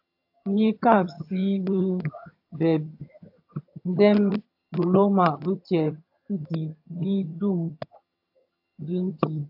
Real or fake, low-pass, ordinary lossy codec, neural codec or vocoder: fake; 5.4 kHz; AAC, 48 kbps; vocoder, 22.05 kHz, 80 mel bands, HiFi-GAN